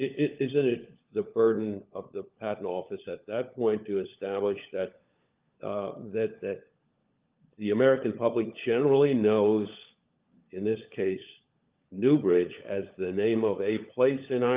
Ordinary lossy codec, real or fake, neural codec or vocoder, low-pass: Opus, 24 kbps; fake; codec, 16 kHz, 16 kbps, FunCodec, trained on LibriTTS, 50 frames a second; 3.6 kHz